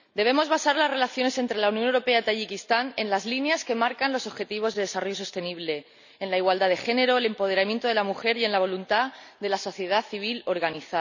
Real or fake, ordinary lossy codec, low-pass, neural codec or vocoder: real; none; 7.2 kHz; none